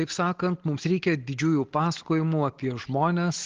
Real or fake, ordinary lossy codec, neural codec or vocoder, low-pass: real; Opus, 16 kbps; none; 7.2 kHz